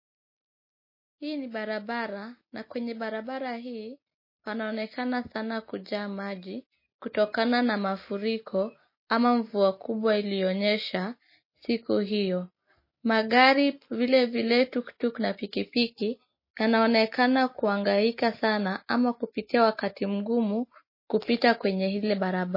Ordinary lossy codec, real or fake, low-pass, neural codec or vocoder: MP3, 24 kbps; real; 5.4 kHz; none